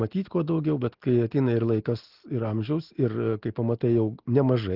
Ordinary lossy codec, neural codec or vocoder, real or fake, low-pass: Opus, 16 kbps; none; real; 5.4 kHz